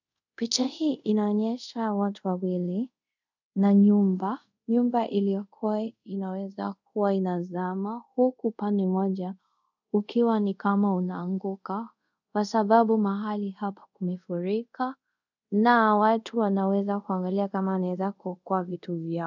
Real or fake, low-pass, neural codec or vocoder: fake; 7.2 kHz; codec, 24 kHz, 0.5 kbps, DualCodec